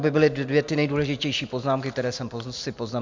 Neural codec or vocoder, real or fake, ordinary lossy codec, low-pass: none; real; MP3, 64 kbps; 7.2 kHz